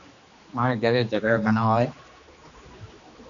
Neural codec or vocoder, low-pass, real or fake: codec, 16 kHz, 2 kbps, X-Codec, HuBERT features, trained on general audio; 7.2 kHz; fake